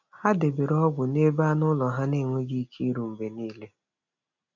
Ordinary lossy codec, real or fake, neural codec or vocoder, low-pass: none; real; none; 7.2 kHz